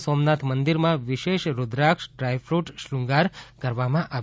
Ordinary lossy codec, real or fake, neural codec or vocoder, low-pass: none; real; none; none